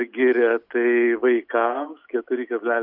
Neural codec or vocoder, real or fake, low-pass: none; real; 5.4 kHz